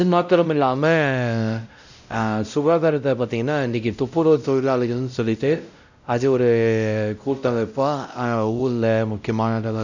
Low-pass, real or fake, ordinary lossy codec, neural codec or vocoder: 7.2 kHz; fake; none; codec, 16 kHz, 0.5 kbps, X-Codec, WavLM features, trained on Multilingual LibriSpeech